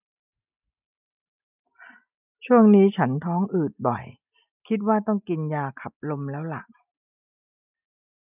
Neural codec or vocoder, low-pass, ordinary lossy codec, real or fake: none; 3.6 kHz; none; real